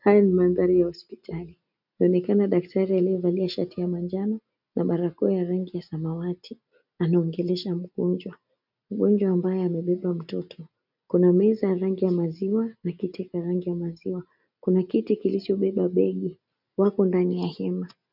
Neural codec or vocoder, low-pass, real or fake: none; 5.4 kHz; real